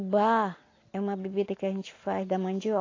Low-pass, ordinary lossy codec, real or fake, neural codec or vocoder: 7.2 kHz; AAC, 32 kbps; real; none